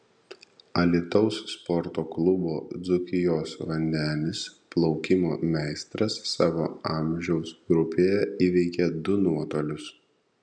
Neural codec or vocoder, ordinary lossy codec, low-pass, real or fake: none; MP3, 96 kbps; 9.9 kHz; real